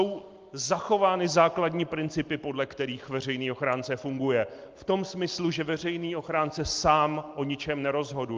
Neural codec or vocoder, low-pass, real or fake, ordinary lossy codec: none; 7.2 kHz; real; Opus, 32 kbps